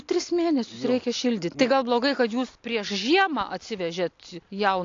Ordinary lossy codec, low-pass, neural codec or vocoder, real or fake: AAC, 48 kbps; 7.2 kHz; none; real